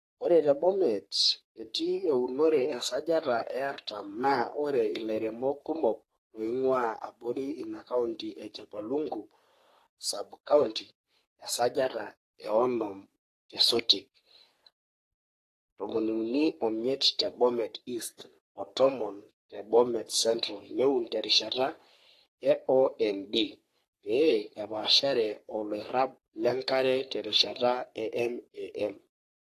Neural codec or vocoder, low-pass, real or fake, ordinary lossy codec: codec, 44.1 kHz, 3.4 kbps, Pupu-Codec; 14.4 kHz; fake; AAC, 48 kbps